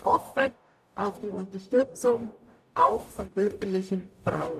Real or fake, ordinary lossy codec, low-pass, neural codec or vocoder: fake; none; 14.4 kHz; codec, 44.1 kHz, 0.9 kbps, DAC